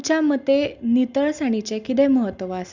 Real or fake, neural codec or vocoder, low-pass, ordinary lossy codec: real; none; 7.2 kHz; Opus, 64 kbps